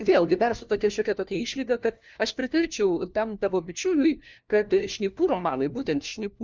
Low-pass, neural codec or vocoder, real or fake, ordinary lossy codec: 7.2 kHz; codec, 16 kHz, 1 kbps, FunCodec, trained on LibriTTS, 50 frames a second; fake; Opus, 24 kbps